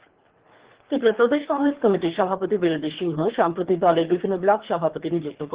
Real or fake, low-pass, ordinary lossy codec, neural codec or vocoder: fake; 3.6 kHz; Opus, 16 kbps; codec, 24 kHz, 3 kbps, HILCodec